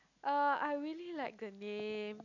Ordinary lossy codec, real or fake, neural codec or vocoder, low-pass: none; real; none; 7.2 kHz